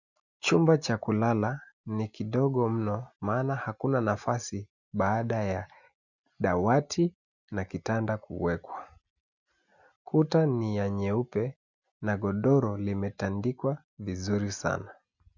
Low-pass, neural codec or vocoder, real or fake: 7.2 kHz; none; real